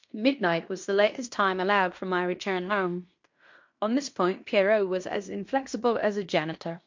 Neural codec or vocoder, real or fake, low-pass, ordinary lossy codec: codec, 16 kHz in and 24 kHz out, 0.9 kbps, LongCat-Audio-Codec, fine tuned four codebook decoder; fake; 7.2 kHz; MP3, 48 kbps